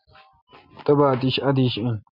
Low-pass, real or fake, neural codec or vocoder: 5.4 kHz; real; none